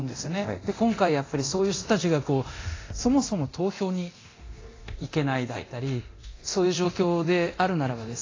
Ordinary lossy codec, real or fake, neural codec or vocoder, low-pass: AAC, 32 kbps; fake; codec, 24 kHz, 0.9 kbps, DualCodec; 7.2 kHz